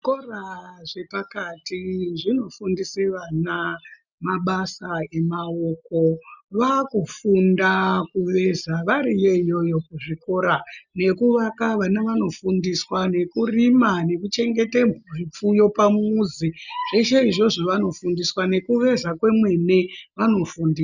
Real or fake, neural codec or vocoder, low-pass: real; none; 7.2 kHz